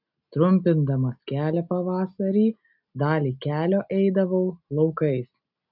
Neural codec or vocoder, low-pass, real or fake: none; 5.4 kHz; real